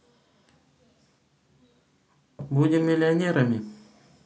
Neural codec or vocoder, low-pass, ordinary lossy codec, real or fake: none; none; none; real